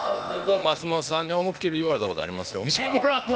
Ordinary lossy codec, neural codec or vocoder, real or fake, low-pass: none; codec, 16 kHz, 0.8 kbps, ZipCodec; fake; none